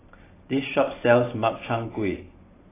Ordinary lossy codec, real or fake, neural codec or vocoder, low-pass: AAC, 16 kbps; fake; vocoder, 44.1 kHz, 128 mel bands every 512 samples, BigVGAN v2; 3.6 kHz